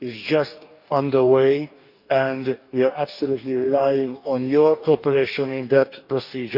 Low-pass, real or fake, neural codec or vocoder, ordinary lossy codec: 5.4 kHz; fake; codec, 44.1 kHz, 2.6 kbps, DAC; none